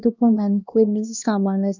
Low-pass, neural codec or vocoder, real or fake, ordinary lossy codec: 7.2 kHz; codec, 16 kHz, 1 kbps, X-Codec, HuBERT features, trained on LibriSpeech; fake; none